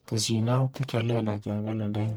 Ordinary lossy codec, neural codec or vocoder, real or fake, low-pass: none; codec, 44.1 kHz, 1.7 kbps, Pupu-Codec; fake; none